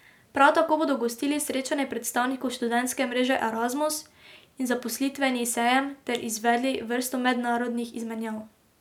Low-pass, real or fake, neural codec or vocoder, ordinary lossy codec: 19.8 kHz; real; none; none